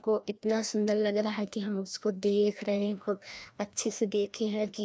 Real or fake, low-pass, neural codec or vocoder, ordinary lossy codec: fake; none; codec, 16 kHz, 1 kbps, FreqCodec, larger model; none